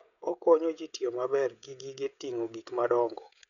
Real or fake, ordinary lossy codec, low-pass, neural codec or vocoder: fake; none; 7.2 kHz; codec, 16 kHz, 16 kbps, FreqCodec, smaller model